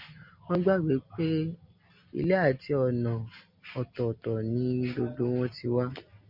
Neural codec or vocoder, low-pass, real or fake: none; 5.4 kHz; real